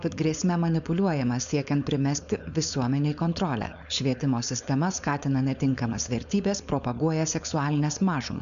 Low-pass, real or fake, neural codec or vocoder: 7.2 kHz; fake; codec, 16 kHz, 4.8 kbps, FACodec